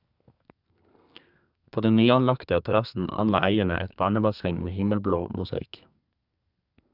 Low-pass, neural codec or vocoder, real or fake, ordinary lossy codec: 5.4 kHz; codec, 32 kHz, 1.9 kbps, SNAC; fake; none